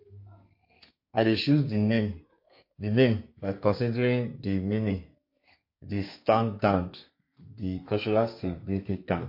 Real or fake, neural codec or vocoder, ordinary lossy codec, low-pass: fake; codec, 32 kHz, 1.9 kbps, SNAC; MP3, 32 kbps; 5.4 kHz